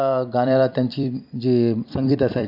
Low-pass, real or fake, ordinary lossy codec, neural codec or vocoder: 5.4 kHz; fake; MP3, 48 kbps; vocoder, 44.1 kHz, 128 mel bands every 256 samples, BigVGAN v2